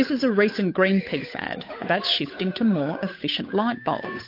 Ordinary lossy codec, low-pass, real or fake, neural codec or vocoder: MP3, 32 kbps; 5.4 kHz; fake; codec, 16 kHz, 8 kbps, FunCodec, trained on Chinese and English, 25 frames a second